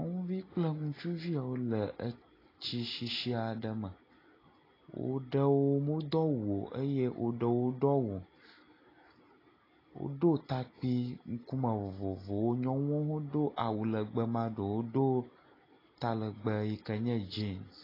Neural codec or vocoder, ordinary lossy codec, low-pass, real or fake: none; AAC, 24 kbps; 5.4 kHz; real